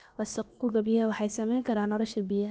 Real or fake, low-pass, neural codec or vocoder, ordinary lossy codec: fake; none; codec, 16 kHz, about 1 kbps, DyCAST, with the encoder's durations; none